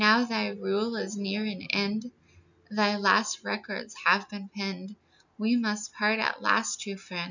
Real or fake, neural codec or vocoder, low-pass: real; none; 7.2 kHz